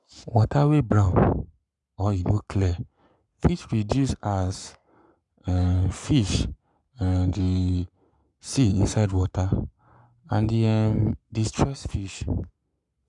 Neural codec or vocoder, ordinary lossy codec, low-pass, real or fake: codec, 44.1 kHz, 7.8 kbps, Pupu-Codec; none; 10.8 kHz; fake